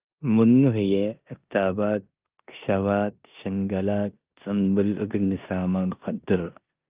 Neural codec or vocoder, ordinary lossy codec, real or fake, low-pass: codec, 16 kHz in and 24 kHz out, 0.9 kbps, LongCat-Audio-Codec, four codebook decoder; Opus, 24 kbps; fake; 3.6 kHz